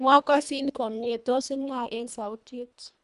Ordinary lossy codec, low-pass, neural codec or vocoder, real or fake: none; 10.8 kHz; codec, 24 kHz, 1.5 kbps, HILCodec; fake